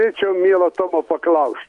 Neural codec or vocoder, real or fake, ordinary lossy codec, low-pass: none; real; MP3, 64 kbps; 10.8 kHz